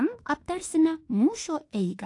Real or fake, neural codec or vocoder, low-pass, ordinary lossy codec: fake; autoencoder, 48 kHz, 32 numbers a frame, DAC-VAE, trained on Japanese speech; 10.8 kHz; AAC, 48 kbps